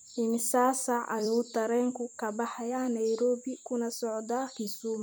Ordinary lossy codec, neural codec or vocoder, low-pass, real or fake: none; vocoder, 44.1 kHz, 128 mel bands every 256 samples, BigVGAN v2; none; fake